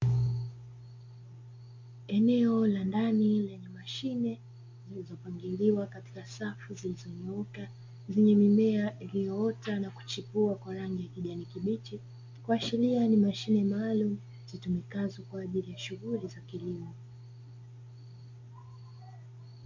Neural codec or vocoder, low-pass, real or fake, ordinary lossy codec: none; 7.2 kHz; real; MP3, 48 kbps